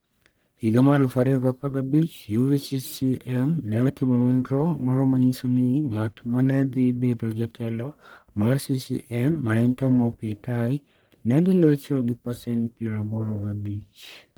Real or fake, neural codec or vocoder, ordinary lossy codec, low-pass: fake; codec, 44.1 kHz, 1.7 kbps, Pupu-Codec; none; none